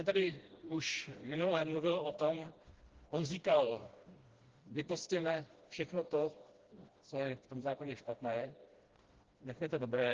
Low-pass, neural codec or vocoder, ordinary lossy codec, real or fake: 7.2 kHz; codec, 16 kHz, 1 kbps, FreqCodec, smaller model; Opus, 16 kbps; fake